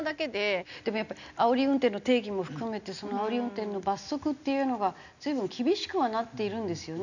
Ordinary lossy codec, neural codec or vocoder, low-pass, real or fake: none; none; 7.2 kHz; real